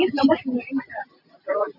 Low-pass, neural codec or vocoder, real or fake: 5.4 kHz; none; real